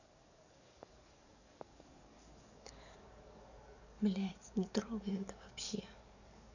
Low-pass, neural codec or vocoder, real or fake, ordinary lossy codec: 7.2 kHz; codec, 44.1 kHz, 7.8 kbps, DAC; fake; none